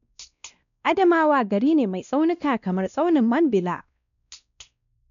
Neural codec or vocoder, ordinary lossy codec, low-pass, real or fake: codec, 16 kHz, 1 kbps, X-Codec, WavLM features, trained on Multilingual LibriSpeech; none; 7.2 kHz; fake